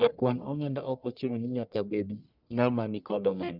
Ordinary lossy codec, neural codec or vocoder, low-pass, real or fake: none; codec, 44.1 kHz, 1.7 kbps, Pupu-Codec; 5.4 kHz; fake